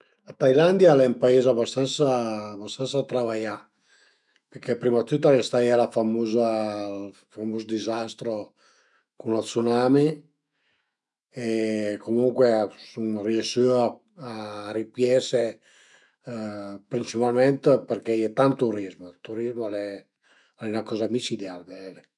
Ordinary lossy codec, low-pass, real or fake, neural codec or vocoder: none; 10.8 kHz; fake; autoencoder, 48 kHz, 128 numbers a frame, DAC-VAE, trained on Japanese speech